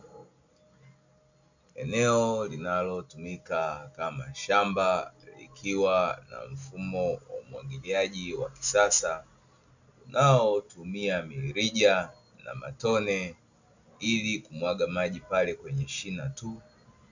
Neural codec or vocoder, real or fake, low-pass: none; real; 7.2 kHz